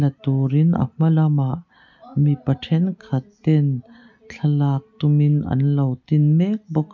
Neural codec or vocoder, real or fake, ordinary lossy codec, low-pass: none; real; none; 7.2 kHz